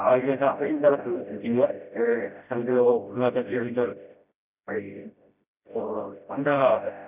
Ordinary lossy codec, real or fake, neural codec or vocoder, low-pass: none; fake; codec, 16 kHz, 0.5 kbps, FreqCodec, smaller model; 3.6 kHz